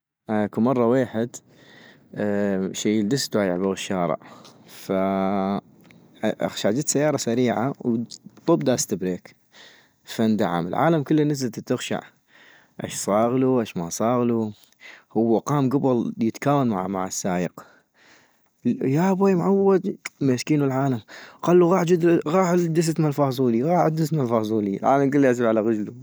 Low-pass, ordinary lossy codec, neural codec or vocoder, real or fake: none; none; none; real